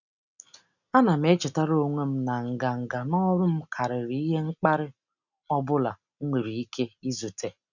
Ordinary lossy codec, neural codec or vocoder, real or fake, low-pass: none; none; real; 7.2 kHz